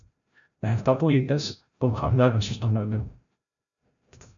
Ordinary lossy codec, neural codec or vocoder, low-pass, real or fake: MP3, 96 kbps; codec, 16 kHz, 0.5 kbps, FreqCodec, larger model; 7.2 kHz; fake